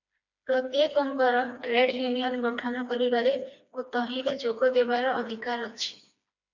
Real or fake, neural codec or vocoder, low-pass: fake; codec, 16 kHz, 2 kbps, FreqCodec, smaller model; 7.2 kHz